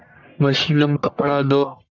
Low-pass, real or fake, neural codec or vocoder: 7.2 kHz; fake; codec, 44.1 kHz, 1.7 kbps, Pupu-Codec